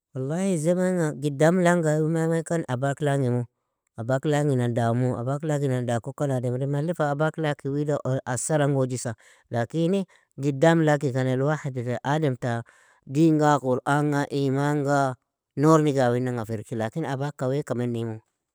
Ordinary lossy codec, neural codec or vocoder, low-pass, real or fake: none; none; none; real